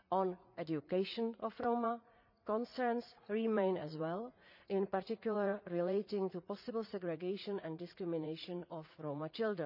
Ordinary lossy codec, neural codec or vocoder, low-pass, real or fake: none; vocoder, 44.1 kHz, 80 mel bands, Vocos; 5.4 kHz; fake